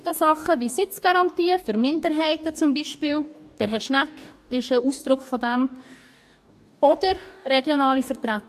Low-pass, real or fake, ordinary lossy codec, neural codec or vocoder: 14.4 kHz; fake; AAC, 96 kbps; codec, 44.1 kHz, 2.6 kbps, DAC